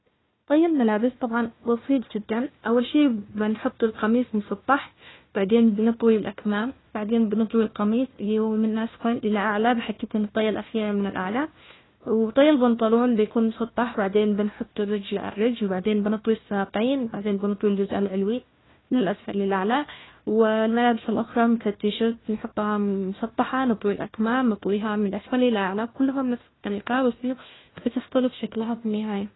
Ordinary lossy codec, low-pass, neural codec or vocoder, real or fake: AAC, 16 kbps; 7.2 kHz; codec, 16 kHz, 1 kbps, FunCodec, trained on Chinese and English, 50 frames a second; fake